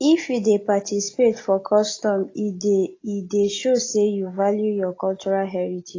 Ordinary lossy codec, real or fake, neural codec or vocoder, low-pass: AAC, 32 kbps; real; none; 7.2 kHz